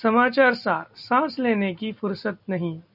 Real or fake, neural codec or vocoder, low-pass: real; none; 5.4 kHz